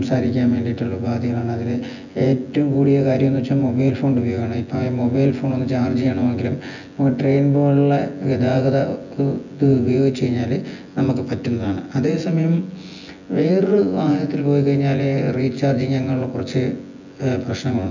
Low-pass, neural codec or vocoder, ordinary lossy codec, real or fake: 7.2 kHz; vocoder, 24 kHz, 100 mel bands, Vocos; none; fake